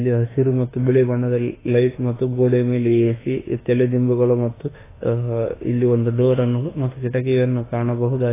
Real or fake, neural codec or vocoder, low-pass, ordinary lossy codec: fake; autoencoder, 48 kHz, 32 numbers a frame, DAC-VAE, trained on Japanese speech; 3.6 kHz; AAC, 16 kbps